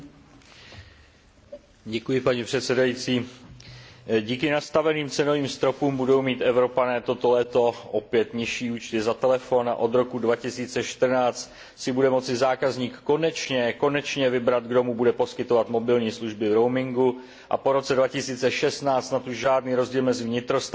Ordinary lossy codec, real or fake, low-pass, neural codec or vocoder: none; real; none; none